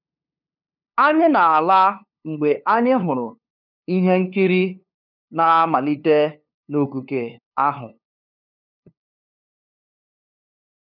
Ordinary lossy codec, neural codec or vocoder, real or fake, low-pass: none; codec, 16 kHz, 2 kbps, FunCodec, trained on LibriTTS, 25 frames a second; fake; 5.4 kHz